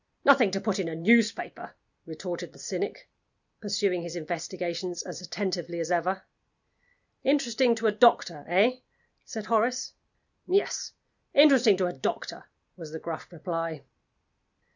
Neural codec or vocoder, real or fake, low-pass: none; real; 7.2 kHz